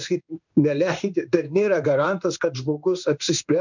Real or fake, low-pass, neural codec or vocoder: fake; 7.2 kHz; codec, 16 kHz in and 24 kHz out, 1 kbps, XY-Tokenizer